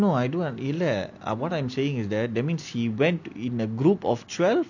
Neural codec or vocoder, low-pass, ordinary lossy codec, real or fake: none; 7.2 kHz; none; real